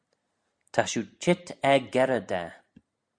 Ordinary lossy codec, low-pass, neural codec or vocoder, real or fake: Opus, 64 kbps; 9.9 kHz; none; real